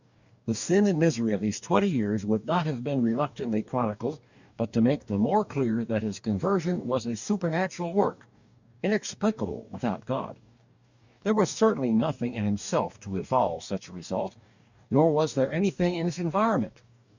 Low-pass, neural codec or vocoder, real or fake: 7.2 kHz; codec, 44.1 kHz, 2.6 kbps, DAC; fake